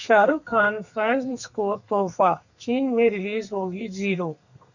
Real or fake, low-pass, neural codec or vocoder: fake; 7.2 kHz; codec, 32 kHz, 1.9 kbps, SNAC